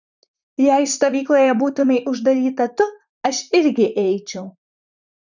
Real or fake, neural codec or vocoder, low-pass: fake; vocoder, 44.1 kHz, 128 mel bands, Pupu-Vocoder; 7.2 kHz